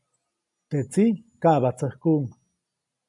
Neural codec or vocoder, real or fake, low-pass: none; real; 10.8 kHz